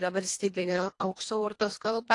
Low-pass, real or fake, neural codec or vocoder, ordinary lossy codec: 10.8 kHz; fake; codec, 24 kHz, 1.5 kbps, HILCodec; AAC, 48 kbps